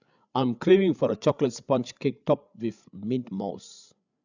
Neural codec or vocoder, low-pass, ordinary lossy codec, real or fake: codec, 16 kHz, 8 kbps, FreqCodec, larger model; 7.2 kHz; none; fake